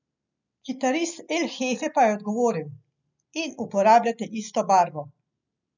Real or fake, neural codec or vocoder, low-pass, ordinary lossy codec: real; none; 7.2 kHz; none